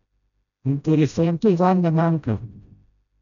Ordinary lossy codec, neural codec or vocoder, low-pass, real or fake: none; codec, 16 kHz, 0.5 kbps, FreqCodec, smaller model; 7.2 kHz; fake